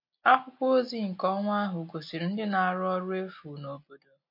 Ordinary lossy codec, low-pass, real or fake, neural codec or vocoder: MP3, 32 kbps; 5.4 kHz; real; none